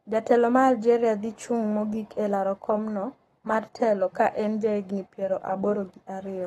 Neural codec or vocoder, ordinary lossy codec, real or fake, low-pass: codec, 44.1 kHz, 7.8 kbps, Pupu-Codec; AAC, 32 kbps; fake; 19.8 kHz